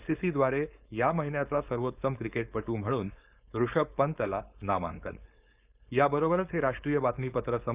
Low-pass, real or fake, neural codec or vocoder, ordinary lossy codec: 3.6 kHz; fake; codec, 16 kHz, 4.8 kbps, FACodec; Opus, 64 kbps